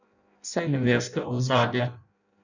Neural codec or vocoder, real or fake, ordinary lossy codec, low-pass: codec, 16 kHz in and 24 kHz out, 0.6 kbps, FireRedTTS-2 codec; fake; none; 7.2 kHz